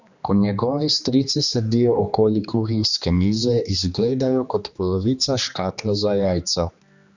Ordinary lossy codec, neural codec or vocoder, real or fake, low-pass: Opus, 64 kbps; codec, 16 kHz, 2 kbps, X-Codec, HuBERT features, trained on general audio; fake; 7.2 kHz